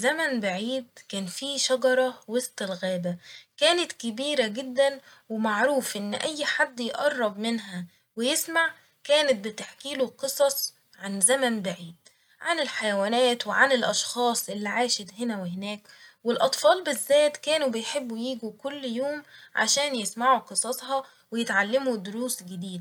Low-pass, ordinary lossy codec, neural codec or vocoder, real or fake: 19.8 kHz; MP3, 96 kbps; none; real